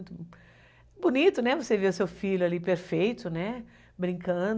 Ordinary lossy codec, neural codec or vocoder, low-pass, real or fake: none; none; none; real